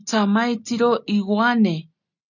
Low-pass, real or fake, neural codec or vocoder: 7.2 kHz; real; none